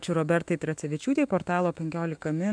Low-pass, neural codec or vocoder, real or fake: 9.9 kHz; autoencoder, 48 kHz, 128 numbers a frame, DAC-VAE, trained on Japanese speech; fake